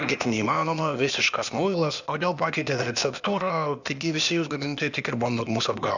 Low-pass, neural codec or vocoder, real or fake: 7.2 kHz; codec, 16 kHz, 0.8 kbps, ZipCodec; fake